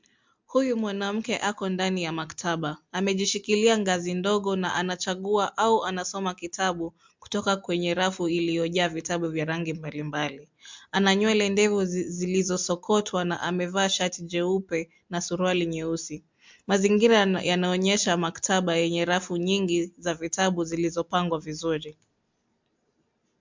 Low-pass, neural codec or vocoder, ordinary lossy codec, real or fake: 7.2 kHz; none; MP3, 64 kbps; real